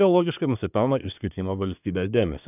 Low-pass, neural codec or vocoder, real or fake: 3.6 kHz; codec, 24 kHz, 1 kbps, SNAC; fake